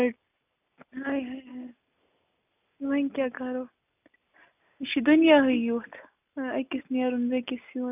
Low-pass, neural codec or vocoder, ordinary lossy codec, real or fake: 3.6 kHz; none; none; real